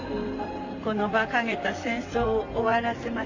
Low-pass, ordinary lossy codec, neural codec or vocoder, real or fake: 7.2 kHz; AAC, 48 kbps; vocoder, 44.1 kHz, 128 mel bands, Pupu-Vocoder; fake